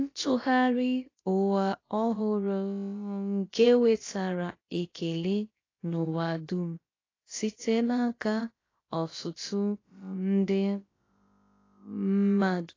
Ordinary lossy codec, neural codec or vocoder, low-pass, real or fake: AAC, 32 kbps; codec, 16 kHz, about 1 kbps, DyCAST, with the encoder's durations; 7.2 kHz; fake